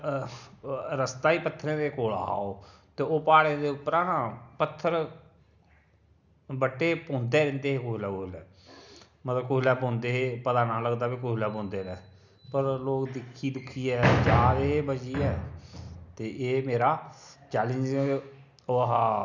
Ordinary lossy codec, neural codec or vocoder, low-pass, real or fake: none; none; 7.2 kHz; real